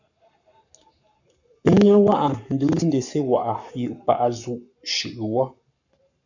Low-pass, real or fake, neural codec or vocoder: 7.2 kHz; fake; codec, 44.1 kHz, 7.8 kbps, Pupu-Codec